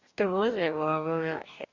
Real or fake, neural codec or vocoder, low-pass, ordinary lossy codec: fake; codec, 44.1 kHz, 2.6 kbps, DAC; 7.2 kHz; Opus, 64 kbps